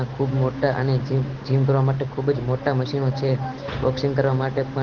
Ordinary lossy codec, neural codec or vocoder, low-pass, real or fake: Opus, 16 kbps; none; 7.2 kHz; real